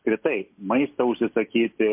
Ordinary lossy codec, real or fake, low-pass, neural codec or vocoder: MP3, 32 kbps; real; 3.6 kHz; none